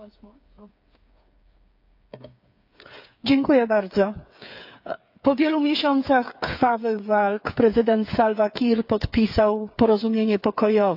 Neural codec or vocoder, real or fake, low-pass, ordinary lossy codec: codec, 16 kHz, 8 kbps, FreqCodec, smaller model; fake; 5.4 kHz; AAC, 48 kbps